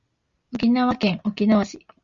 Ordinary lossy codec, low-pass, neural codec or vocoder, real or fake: Opus, 64 kbps; 7.2 kHz; none; real